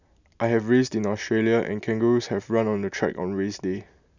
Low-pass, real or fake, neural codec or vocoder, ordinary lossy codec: 7.2 kHz; real; none; none